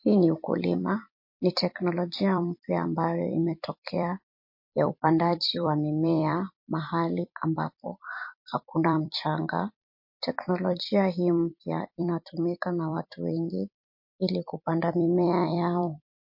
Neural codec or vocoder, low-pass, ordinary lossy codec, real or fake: vocoder, 44.1 kHz, 128 mel bands every 256 samples, BigVGAN v2; 5.4 kHz; MP3, 32 kbps; fake